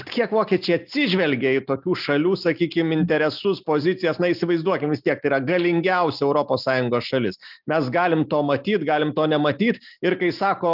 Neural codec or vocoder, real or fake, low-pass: none; real; 5.4 kHz